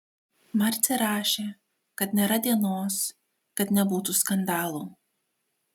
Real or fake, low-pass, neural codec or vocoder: real; 19.8 kHz; none